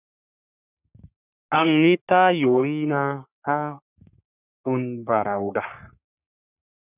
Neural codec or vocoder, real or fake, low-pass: codec, 44.1 kHz, 3.4 kbps, Pupu-Codec; fake; 3.6 kHz